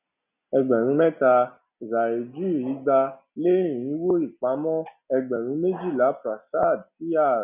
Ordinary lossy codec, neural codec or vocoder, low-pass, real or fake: none; none; 3.6 kHz; real